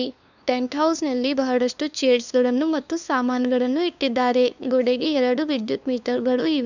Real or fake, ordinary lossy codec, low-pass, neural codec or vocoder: fake; none; 7.2 kHz; codec, 16 kHz, 2 kbps, FunCodec, trained on LibriTTS, 25 frames a second